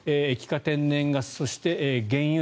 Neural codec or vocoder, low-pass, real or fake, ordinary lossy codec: none; none; real; none